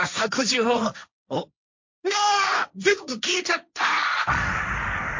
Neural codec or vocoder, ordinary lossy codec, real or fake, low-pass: codec, 16 kHz, 1.1 kbps, Voila-Tokenizer; MP3, 48 kbps; fake; 7.2 kHz